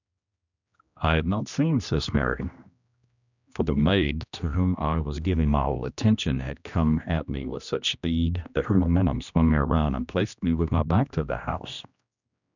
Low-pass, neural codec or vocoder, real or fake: 7.2 kHz; codec, 16 kHz, 1 kbps, X-Codec, HuBERT features, trained on general audio; fake